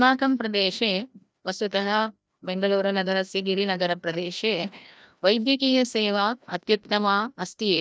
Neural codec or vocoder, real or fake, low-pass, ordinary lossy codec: codec, 16 kHz, 1 kbps, FreqCodec, larger model; fake; none; none